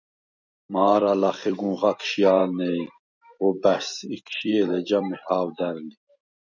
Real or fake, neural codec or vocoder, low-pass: fake; vocoder, 44.1 kHz, 128 mel bands every 256 samples, BigVGAN v2; 7.2 kHz